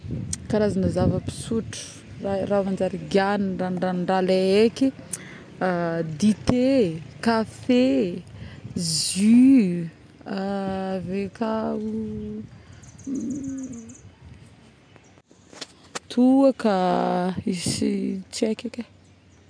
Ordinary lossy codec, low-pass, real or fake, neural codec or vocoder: none; 9.9 kHz; real; none